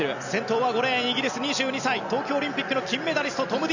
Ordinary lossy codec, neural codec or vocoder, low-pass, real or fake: none; none; 7.2 kHz; real